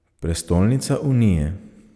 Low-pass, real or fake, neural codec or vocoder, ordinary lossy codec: none; real; none; none